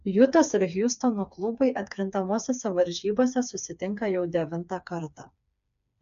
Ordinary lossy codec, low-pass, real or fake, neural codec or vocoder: MP3, 64 kbps; 7.2 kHz; fake; codec, 16 kHz, 4 kbps, FreqCodec, smaller model